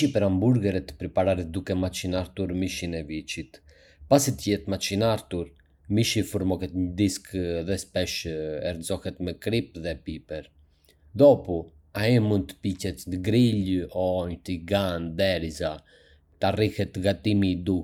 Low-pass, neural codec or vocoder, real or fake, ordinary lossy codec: 19.8 kHz; none; real; none